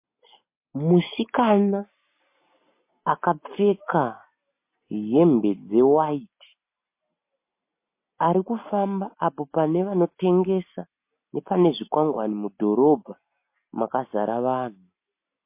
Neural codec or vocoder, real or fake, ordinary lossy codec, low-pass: none; real; MP3, 24 kbps; 3.6 kHz